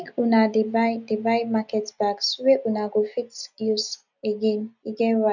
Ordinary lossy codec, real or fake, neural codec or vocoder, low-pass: none; real; none; 7.2 kHz